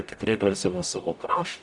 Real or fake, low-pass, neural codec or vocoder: fake; 10.8 kHz; codec, 44.1 kHz, 0.9 kbps, DAC